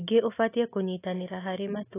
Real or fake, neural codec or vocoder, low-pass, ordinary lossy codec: real; none; 3.6 kHz; AAC, 16 kbps